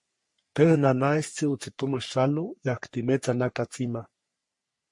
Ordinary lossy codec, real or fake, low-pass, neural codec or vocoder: MP3, 48 kbps; fake; 10.8 kHz; codec, 44.1 kHz, 3.4 kbps, Pupu-Codec